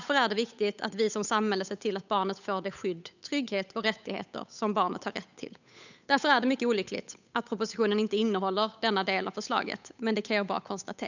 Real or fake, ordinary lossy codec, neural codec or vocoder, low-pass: fake; none; codec, 16 kHz, 8 kbps, FunCodec, trained on Chinese and English, 25 frames a second; 7.2 kHz